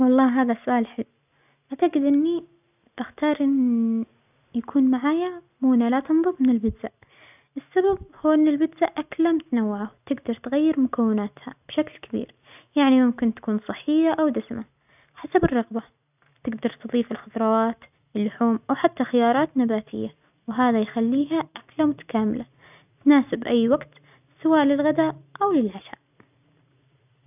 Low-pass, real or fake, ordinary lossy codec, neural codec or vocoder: 3.6 kHz; real; none; none